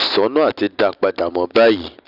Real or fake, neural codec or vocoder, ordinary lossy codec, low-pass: real; none; none; 5.4 kHz